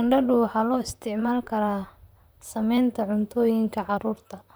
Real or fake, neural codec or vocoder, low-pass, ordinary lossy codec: fake; vocoder, 44.1 kHz, 128 mel bands, Pupu-Vocoder; none; none